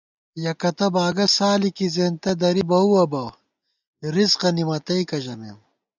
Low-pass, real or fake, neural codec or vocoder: 7.2 kHz; real; none